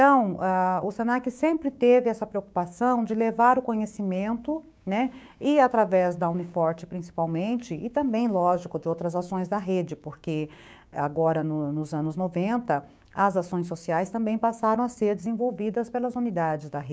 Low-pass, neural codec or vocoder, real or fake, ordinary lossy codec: none; codec, 16 kHz, 6 kbps, DAC; fake; none